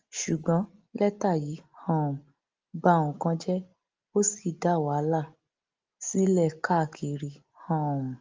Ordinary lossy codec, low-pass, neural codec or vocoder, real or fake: Opus, 24 kbps; 7.2 kHz; none; real